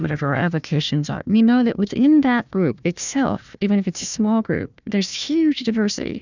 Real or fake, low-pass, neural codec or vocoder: fake; 7.2 kHz; codec, 16 kHz, 1 kbps, FunCodec, trained on Chinese and English, 50 frames a second